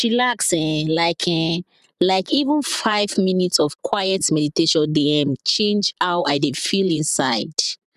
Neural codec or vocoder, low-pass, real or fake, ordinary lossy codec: vocoder, 44.1 kHz, 128 mel bands, Pupu-Vocoder; 14.4 kHz; fake; none